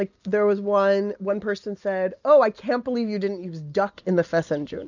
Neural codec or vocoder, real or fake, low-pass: none; real; 7.2 kHz